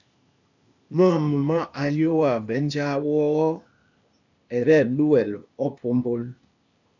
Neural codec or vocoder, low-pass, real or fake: codec, 16 kHz, 0.8 kbps, ZipCodec; 7.2 kHz; fake